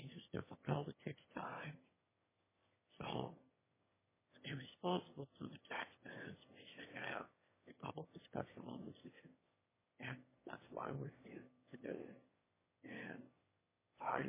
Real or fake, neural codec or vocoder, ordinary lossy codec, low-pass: fake; autoencoder, 22.05 kHz, a latent of 192 numbers a frame, VITS, trained on one speaker; MP3, 16 kbps; 3.6 kHz